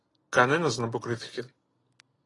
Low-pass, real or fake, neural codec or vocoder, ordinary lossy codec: 10.8 kHz; real; none; AAC, 32 kbps